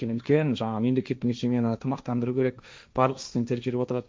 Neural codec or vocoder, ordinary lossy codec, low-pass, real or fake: codec, 16 kHz, 1.1 kbps, Voila-Tokenizer; none; none; fake